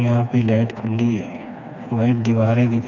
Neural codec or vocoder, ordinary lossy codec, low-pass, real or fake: codec, 16 kHz, 2 kbps, FreqCodec, smaller model; none; 7.2 kHz; fake